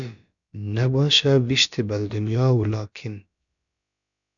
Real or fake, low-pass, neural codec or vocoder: fake; 7.2 kHz; codec, 16 kHz, about 1 kbps, DyCAST, with the encoder's durations